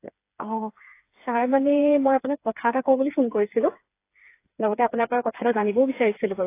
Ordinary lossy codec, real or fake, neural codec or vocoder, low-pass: AAC, 24 kbps; fake; codec, 16 kHz, 4 kbps, FreqCodec, smaller model; 3.6 kHz